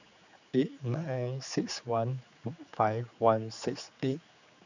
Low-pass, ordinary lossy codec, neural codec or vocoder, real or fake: 7.2 kHz; none; codec, 16 kHz, 4 kbps, X-Codec, HuBERT features, trained on general audio; fake